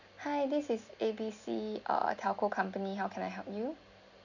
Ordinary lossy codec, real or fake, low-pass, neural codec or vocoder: none; real; 7.2 kHz; none